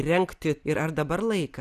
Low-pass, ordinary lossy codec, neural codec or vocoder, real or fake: 14.4 kHz; Opus, 64 kbps; none; real